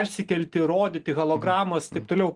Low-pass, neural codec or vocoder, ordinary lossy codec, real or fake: 10.8 kHz; none; Opus, 24 kbps; real